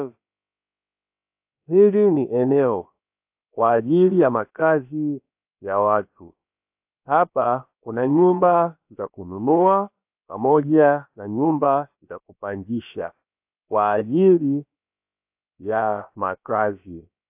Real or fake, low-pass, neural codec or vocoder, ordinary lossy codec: fake; 3.6 kHz; codec, 16 kHz, about 1 kbps, DyCAST, with the encoder's durations; AAC, 32 kbps